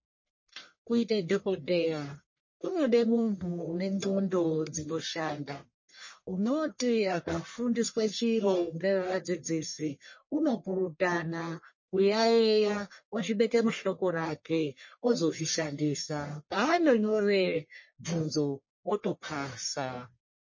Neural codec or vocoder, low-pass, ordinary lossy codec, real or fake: codec, 44.1 kHz, 1.7 kbps, Pupu-Codec; 7.2 kHz; MP3, 32 kbps; fake